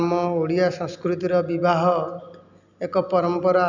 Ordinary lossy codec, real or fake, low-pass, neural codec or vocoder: none; real; 7.2 kHz; none